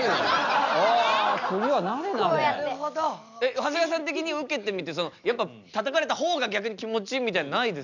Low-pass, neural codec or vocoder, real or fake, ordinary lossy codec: 7.2 kHz; none; real; none